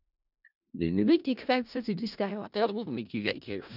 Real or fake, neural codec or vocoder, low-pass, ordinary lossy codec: fake; codec, 16 kHz in and 24 kHz out, 0.4 kbps, LongCat-Audio-Codec, four codebook decoder; 5.4 kHz; none